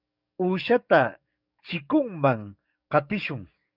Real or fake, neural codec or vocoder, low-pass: fake; codec, 44.1 kHz, 7.8 kbps, DAC; 5.4 kHz